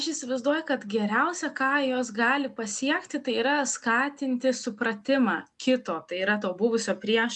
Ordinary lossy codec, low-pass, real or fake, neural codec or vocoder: MP3, 96 kbps; 10.8 kHz; real; none